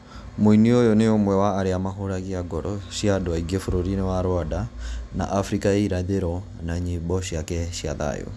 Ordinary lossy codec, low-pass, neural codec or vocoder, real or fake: none; none; none; real